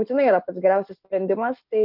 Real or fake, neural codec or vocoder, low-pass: real; none; 5.4 kHz